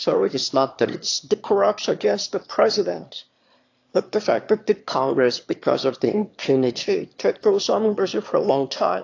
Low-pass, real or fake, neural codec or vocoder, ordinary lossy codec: 7.2 kHz; fake; autoencoder, 22.05 kHz, a latent of 192 numbers a frame, VITS, trained on one speaker; AAC, 48 kbps